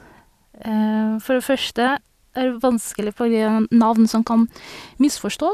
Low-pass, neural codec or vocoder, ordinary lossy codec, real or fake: 14.4 kHz; none; none; real